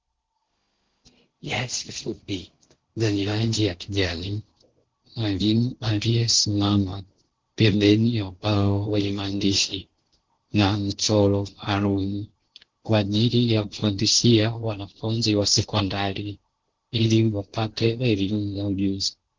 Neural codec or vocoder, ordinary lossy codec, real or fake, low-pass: codec, 16 kHz in and 24 kHz out, 0.6 kbps, FocalCodec, streaming, 4096 codes; Opus, 16 kbps; fake; 7.2 kHz